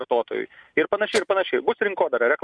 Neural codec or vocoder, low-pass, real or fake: none; 9.9 kHz; real